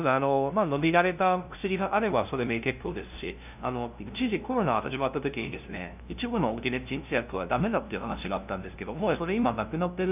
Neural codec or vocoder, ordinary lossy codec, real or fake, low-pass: codec, 16 kHz, 0.5 kbps, FunCodec, trained on LibriTTS, 25 frames a second; none; fake; 3.6 kHz